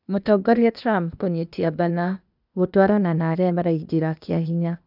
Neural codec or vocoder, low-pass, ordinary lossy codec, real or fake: codec, 16 kHz, 0.8 kbps, ZipCodec; 5.4 kHz; none; fake